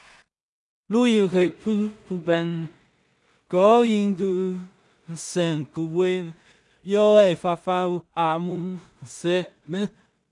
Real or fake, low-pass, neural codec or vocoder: fake; 10.8 kHz; codec, 16 kHz in and 24 kHz out, 0.4 kbps, LongCat-Audio-Codec, two codebook decoder